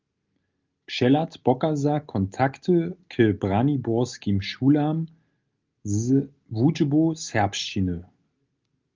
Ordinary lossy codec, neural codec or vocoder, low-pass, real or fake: Opus, 32 kbps; none; 7.2 kHz; real